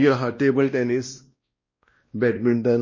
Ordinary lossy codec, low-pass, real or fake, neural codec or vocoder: MP3, 32 kbps; 7.2 kHz; fake; codec, 16 kHz, 1 kbps, X-Codec, WavLM features, trained on Multilingual LibriSpeech